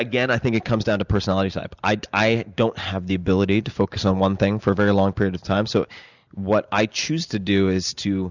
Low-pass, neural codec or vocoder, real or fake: 7.2 kHz; none; real